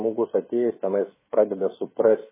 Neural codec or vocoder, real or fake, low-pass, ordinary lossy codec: codec, 16 kHz, 16 kbps, FreqCodec, smaller model; fake; 3.6 kHz; MP3, 16 kbps